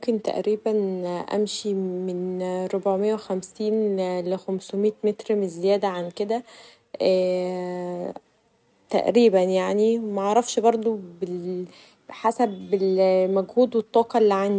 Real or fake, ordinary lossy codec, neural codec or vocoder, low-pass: real; none; none; none